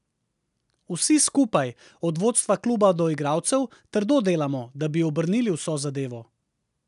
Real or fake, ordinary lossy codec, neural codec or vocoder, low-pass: real; none; none; 10.8 kHz